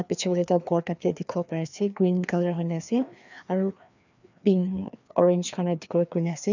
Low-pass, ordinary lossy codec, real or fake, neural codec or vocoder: 7.2 kHz; none; fake; codec, 16 kHz, 2 kbps, FreqCodec, larger model